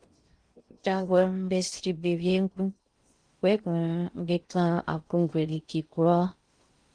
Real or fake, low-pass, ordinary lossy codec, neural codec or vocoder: fake; 9.9 kHz; Opus, 24 kbps; codec, 16 kHz in and 24 kHz out, 0.6 kbps, FocalCodec, streaming, 2048 codes